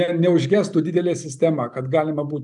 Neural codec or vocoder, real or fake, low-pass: none; real; 10.8 kHz